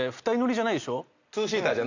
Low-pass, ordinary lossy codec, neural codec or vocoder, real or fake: 7.2 kHz; Opus, 64 kbps; none; real